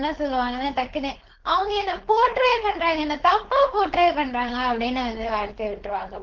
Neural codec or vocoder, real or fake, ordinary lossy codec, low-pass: codec, 16 kHz, 4.8 kbps, FACodec; fake; Opus, 16 kbps; 7.2 kHz